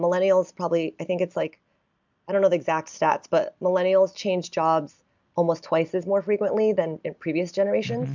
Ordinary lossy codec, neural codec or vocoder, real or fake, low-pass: MP3, 64 kbps; none; real; 7.2 kHz